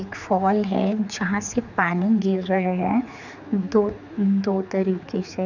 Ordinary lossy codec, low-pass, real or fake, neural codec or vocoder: none; 7.2 kHz; fake; codec, 16 kHz, 4 kbps, X-Codec, HuBERT features, trained on general audio